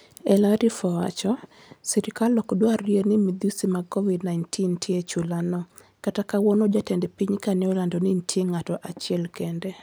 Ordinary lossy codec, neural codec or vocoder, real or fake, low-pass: none; none; real; none